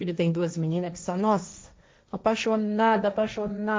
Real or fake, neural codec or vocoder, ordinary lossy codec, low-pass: fake; codec, 16 kHz, 1.1 kbps, Voila-Tokenizer; none; none